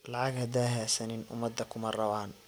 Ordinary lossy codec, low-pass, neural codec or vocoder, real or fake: none; none; none; real